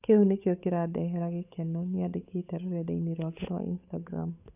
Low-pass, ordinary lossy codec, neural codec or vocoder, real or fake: 3.6 kHz; none; codec, 16 kHz, 8 kbps, FunCodec, trained on Chinese and English, 25 frames a second; fake